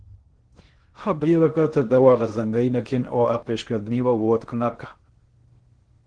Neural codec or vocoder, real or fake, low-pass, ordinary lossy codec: codec, 16 kHz in and 24 kHz out, 0.6 kbps, FocalCodec, streaming, 2048 codes; fake; 9.9 kHz; Opus, 16 kbps